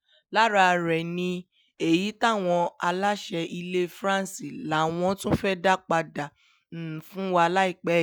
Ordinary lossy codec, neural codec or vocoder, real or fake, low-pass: none; none; real; none